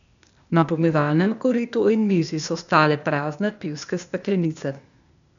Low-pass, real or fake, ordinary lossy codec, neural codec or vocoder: 7.2 kHz; fake; none; codec, 16 kHz, 0.8 kbps, ZipCodec